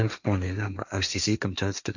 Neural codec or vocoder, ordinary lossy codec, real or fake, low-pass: codec, 16 kHz, 1.1 kbps, Voila-Tokenizer; none; fake; 7.2 kHz